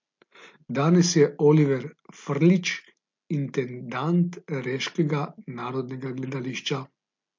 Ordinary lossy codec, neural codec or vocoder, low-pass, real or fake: MP3, 48 kbps; none; 7.2 kHz; real